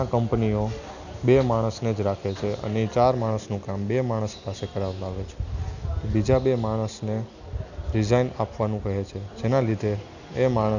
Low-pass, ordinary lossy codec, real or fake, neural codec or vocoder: 7.2 kHz; none; real; none